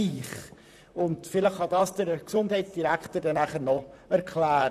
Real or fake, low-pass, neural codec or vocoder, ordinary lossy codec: fake; 14.4 kHz; vocoder, 44.1 kHz, 128 mel bands, Pupu-Vocoder; none